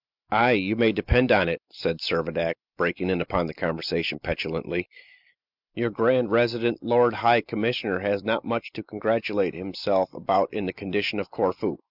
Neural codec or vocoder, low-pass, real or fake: none; 5.4 kHz; real